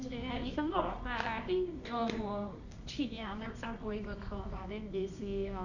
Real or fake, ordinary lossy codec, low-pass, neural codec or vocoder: fake; none; 7.2 kHz; codec, 24 kHz, 0.9 kbps, WavTokenizer, medium music audio release